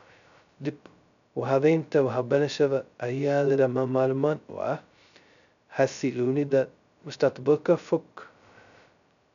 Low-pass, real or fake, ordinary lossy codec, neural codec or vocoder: 7.2 kHz; fake; none; codec, 16 kHz, 0.2 kbps, FocalCodec